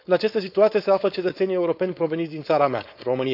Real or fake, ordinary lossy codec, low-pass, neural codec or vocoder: fake; none; 5.4 kHz; codec, 16 kHz, 4.8 kbps, FACodec